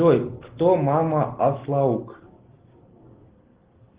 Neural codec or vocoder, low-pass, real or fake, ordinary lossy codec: none; 3.6 kHz; real; Opus, 16 kbps